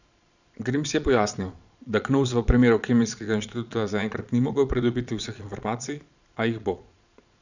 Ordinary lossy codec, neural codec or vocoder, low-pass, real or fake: none; vocoder, 22.05 kHz, 80 mel bands, Vocos; 7.2 kHz; fake